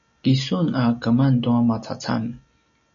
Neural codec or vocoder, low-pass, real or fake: none; 7.2 kHz; real